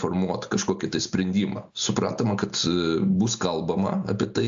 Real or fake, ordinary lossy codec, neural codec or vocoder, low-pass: real; AAC, 64 kbps; none; 7.2 kHz